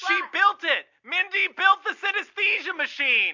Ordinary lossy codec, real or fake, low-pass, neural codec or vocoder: MP3, 48 kbps; real; 7.2 kHz; none